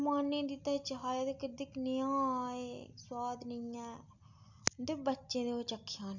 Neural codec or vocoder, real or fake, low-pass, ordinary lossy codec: none; real; 7.2 kHz; none